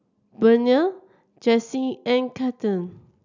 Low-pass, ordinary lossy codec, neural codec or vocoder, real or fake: 7.2 kHz; none; none; real